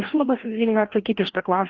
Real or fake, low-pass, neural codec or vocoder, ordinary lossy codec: fake; 7.2 kHz; codec, 16 kHz, 1 kbps, FreqCodec, larger model; Opus, 16 kbps